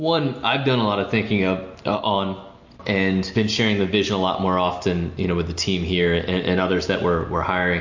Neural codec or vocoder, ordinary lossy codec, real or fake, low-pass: none; MP3, 48 kbps; real; 7.2 kHz